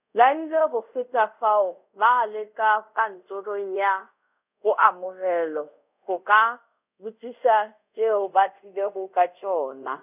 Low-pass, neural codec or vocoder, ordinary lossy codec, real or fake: 3.6 kHz; codec, 24 kHz, 0.5 kbps, DualCodec; none; fake